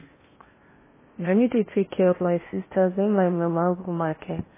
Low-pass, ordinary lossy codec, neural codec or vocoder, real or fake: 3.6 kHz; MP3, 16 kbps; codec, 16 kHz in and 24 kHz out, 0.8 kbps, FocalCodec, streaming, 65536 codes; fake